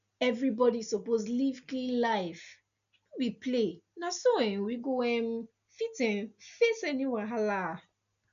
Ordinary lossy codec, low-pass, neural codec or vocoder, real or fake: none; 7.2 kHz; none; real